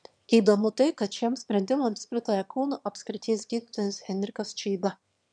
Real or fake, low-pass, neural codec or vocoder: fake; 9.9 kHz; autoencoder, 22.05 kHz, a latent of 192 numbers a frame, VITS, trained on one speaker